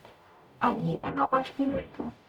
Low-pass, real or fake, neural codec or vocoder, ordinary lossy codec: 19.8 kHz; fake; codec, 44.1 kHz, 0.9 kbps, DAC; none